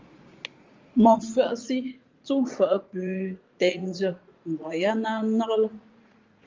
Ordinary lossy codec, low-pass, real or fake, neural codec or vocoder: Opus, 32 kbps; 7.2 kHz; fake; vocoder, 44.1 kHz, 80 mel bands, Vocos